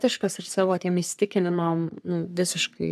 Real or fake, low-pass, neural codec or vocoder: fake; 14.4 kHz; codec, 44.1 kHz, 3.4 kbps, Pupu-Codec